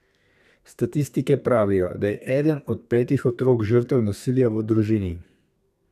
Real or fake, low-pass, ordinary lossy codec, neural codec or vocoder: fake; 14.4 kHz; none; codec, 32 kHz, 1.9 kbps, SNAC